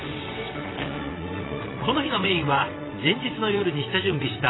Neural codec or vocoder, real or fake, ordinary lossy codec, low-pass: vocoder, 22.05 kHz, 80 mel bands, WaveNeXt; fake; AAC, 16 kbps; 7.2 kHz